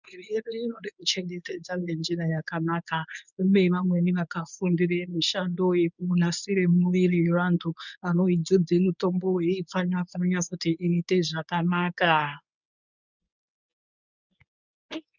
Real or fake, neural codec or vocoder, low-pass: fake; codec, 24 kHz, 0.9 kbps, WavTokenizer, medium speech release version 1; 7.2 kHz